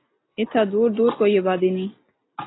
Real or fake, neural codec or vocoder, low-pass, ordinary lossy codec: real; none; 7.2 kHz; AAC, 16 kbps